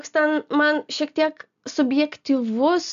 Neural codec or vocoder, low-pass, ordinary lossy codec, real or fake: none; 7.2 kHz; MP3, 64 kbps; real